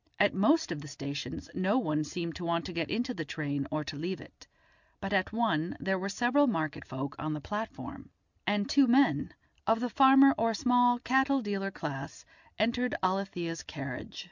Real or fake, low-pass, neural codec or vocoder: real; 7.2 kHz; none